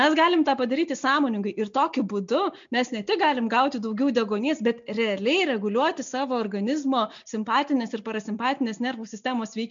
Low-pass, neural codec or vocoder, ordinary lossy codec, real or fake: 7.2 kHz; none; MP3, 64 kbps; real